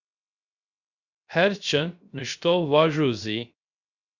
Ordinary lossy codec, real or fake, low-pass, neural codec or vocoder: Opus, 64 kbps; fake; 7.2 kHz; codec, 16 kHz, 0.3 kbps, FocalCodec